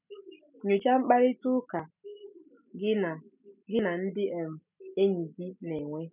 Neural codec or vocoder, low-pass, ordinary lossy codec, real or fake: none; 3.6 kHz; none; real